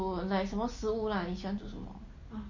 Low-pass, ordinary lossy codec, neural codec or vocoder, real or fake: 7.2 kHz; MP3, 32 kbps; none; real